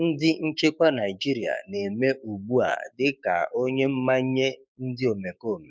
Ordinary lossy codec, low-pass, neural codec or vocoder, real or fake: none; none; codec, 16 kHz, 6 kbps, DAC; fake